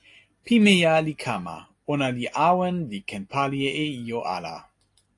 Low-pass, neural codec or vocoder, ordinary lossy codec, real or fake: 10.8 kHz; none; AAC, 48 kbps; real